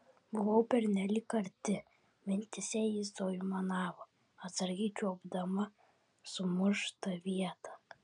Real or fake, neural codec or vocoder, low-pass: real; none; 9.9 kHz